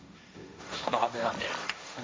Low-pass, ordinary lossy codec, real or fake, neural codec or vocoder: none; none; fake; codec, 16 kHz, 1.1 kbps, Voila-Tokenizer